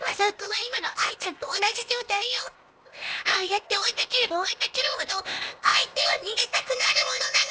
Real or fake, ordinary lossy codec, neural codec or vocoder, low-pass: fake; none; codec, 16 kHz, 0.8 kbps, ZipCodec; none